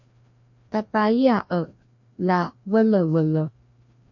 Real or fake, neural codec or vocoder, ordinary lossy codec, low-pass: fake; codec, 16 kHz, 1 kbps, FreqCodec, larger model; MP3, 48 kbps; 7.2 kHz